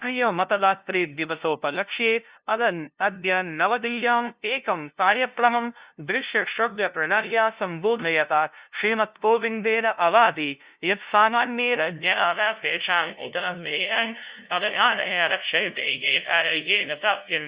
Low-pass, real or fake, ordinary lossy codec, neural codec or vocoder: 3.6 kHz; fake; Opus, 64 kbps; codec, 16 kHz, 0.5 kbps, FunCodec, trained on LibriTTS, 25 frames a second